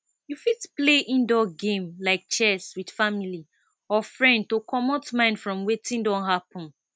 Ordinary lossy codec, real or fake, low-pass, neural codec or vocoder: none; real; none; none